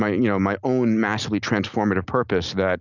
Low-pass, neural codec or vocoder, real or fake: 7.2 kHz; none; real